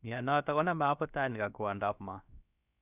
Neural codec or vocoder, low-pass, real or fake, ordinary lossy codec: codec, 16 kHz, about 1 kbps, DyCAST, with the encoder's durations; 3.6 kHz; fake; none